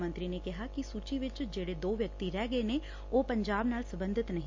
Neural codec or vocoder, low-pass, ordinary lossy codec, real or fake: none; 7.2 kHz; MP3, 48 kbps; real